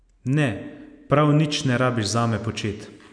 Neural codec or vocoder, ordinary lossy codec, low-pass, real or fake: none; none; 9.9 kHz; real